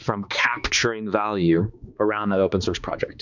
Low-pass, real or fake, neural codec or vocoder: 7.2 kHz; fake; codec, 16 kHz, 2 kbps, X-Codec, HuBERT features, trained on balanced general audio